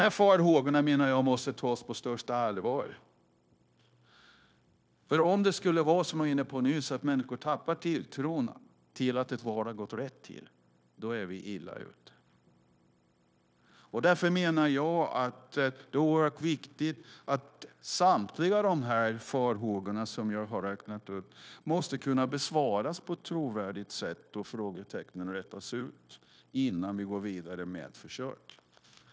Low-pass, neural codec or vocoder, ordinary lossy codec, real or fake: none; codec, 16 kHz, 0.9 kbps, LongCat-Audio-Codec; none; fake